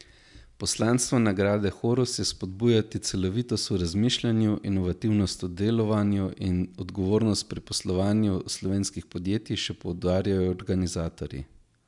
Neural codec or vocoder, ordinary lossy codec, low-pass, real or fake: none; MP3, 96 kbps; 10.8 kHz; real